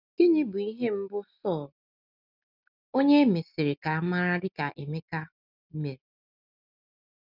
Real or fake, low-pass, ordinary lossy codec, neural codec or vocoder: real; 5.4 kHz; none; none